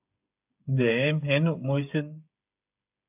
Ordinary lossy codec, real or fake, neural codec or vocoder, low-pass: AAC, 32 kbps; fake; codec, 16 kHz, 8 kbps, FreqCodec, smaller model; 3.6 kHz